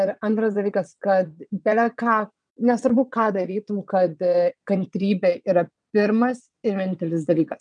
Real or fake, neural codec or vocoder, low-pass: fake; vocoder, 22.05 kHz, 80 mel bands, WaveNeXt; 9.9 kHz